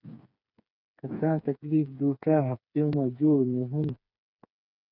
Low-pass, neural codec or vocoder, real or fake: 5.4 kHz; codec, 16 kHz, 4 kbps, FreqCodec, smaller model; fake